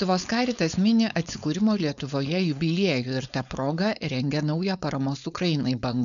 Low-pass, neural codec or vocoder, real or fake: 7.2 kHz; codec, 16 kHz, 4.8 kbps, FACodec; fake